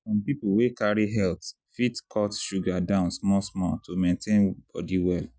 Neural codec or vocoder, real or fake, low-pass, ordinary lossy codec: none; real; none; none